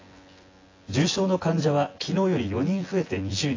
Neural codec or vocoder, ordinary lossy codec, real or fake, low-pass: vocoder, 24 kHz, 100 mel bands, Vocos; AAC, 32 kbps; fake; 7.2 kHz